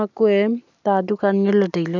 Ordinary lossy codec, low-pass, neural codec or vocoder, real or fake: none; 7.2 kHz; none; real